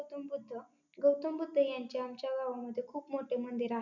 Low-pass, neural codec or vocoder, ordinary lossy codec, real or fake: 7.2 kHz; none; none; real